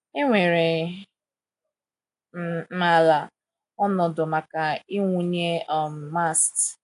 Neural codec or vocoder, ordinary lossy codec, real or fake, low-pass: none; AAC, 64 kbps; real; 10.8 kHz